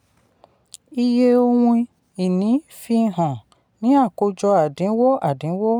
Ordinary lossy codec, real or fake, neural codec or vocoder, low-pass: none; real; none; 19.8 kHz